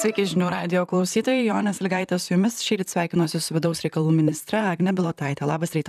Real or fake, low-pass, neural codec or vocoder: fake; 14.4 kHz; vocoder, 44.1 kHz, 128 mel bands, Pupu-Vocoder